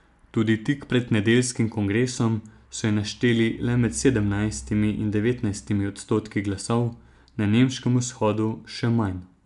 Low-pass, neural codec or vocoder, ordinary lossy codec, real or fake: 10.8 kHz; none; MP3, 96 kbps; real